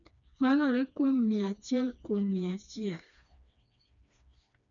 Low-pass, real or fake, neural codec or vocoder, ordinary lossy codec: 7.2 kHz; fake; codec, 16 kHz, 2 kbps, FreqCodec, smaller model; none